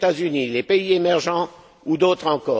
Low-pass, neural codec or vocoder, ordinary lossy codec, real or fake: none; none; none; real